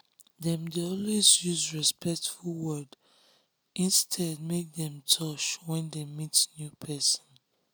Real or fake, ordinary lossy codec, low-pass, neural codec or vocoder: real; none; none; none